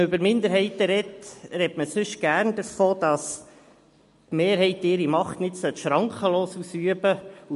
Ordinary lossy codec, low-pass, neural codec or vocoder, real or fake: MP3, 48 kbps; 14.4 kHz; none; real